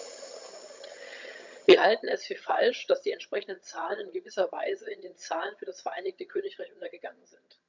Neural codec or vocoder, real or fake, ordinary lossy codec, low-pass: vocoder, 22.05 kHz, 80 mel bands, HiFi-GAN; fake; MP3, 64 kbps; 7.2 kHz